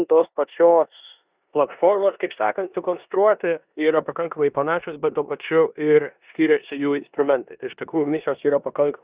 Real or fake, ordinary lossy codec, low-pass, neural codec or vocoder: fake; Opus, 64 kbps; 3.6 kHz; codec, 16 kHz in and 24 kHz out, 0.9 kbps, LongCat-Audio-Codec, four codebook decoder